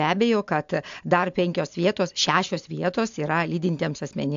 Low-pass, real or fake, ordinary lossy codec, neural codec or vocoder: 7.2 kHz; real; AAC, 64 kbps; none